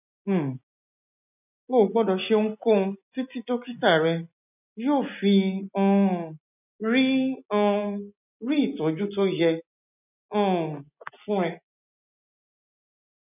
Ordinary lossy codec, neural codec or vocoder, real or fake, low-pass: none; none; real; 3.6 kHz